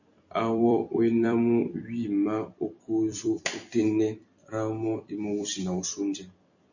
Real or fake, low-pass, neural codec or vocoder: fake; 7.2 kHz; vocoder, 44.1 kHz, 128 mel bands every 256 samples, BigVGAN v2